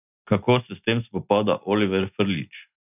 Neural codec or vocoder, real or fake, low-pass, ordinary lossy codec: none; real; 3.6 kHz; none